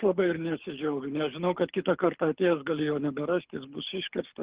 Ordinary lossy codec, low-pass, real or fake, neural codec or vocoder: Opus, 16 kbps; 3.6 kHz; fake; codec, 24 kHz, 6 kbps, HILCodec